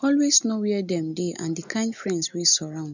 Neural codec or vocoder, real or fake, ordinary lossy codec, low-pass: none; real; none; 7.2 kHz